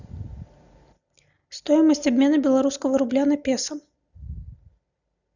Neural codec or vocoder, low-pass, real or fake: none; 7.2 kHz; real